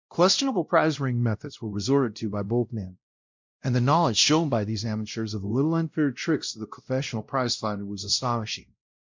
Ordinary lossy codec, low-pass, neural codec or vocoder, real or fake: MP3, 64 kbps; 7.2 kHz; codec, 16 kHz, 0.5 kbps, X-Codec, WavLM features, trained on Multilingual LibriSpeech; fake